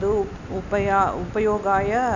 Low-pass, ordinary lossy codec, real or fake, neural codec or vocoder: 7.2 kHz; none; real; none